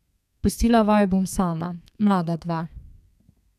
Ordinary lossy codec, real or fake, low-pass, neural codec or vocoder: none; fake; 14.4 kHz; codec, 32 kHz, 1.9 kbps, SNAC